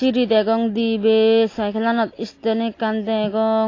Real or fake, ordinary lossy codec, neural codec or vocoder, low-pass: real; AAC, 32 kbps; none; 7.2 kHz